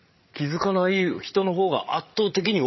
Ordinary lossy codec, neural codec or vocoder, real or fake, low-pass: MP3, 24 kbps; codec, 16 kHz, 8 kbps, FreqCodec, larger model; fake; 7.2 kHz